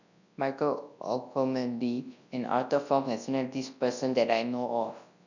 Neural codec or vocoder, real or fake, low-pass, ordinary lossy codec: codec, 24 kHz, 0.9 kbps, WavTokenizer, large speech release; fake; 7.2 kHz; none